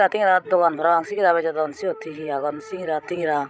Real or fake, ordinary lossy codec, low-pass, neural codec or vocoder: fake; none; none; codec, 16 kHz, 16 kbps, FreqCodec, larger model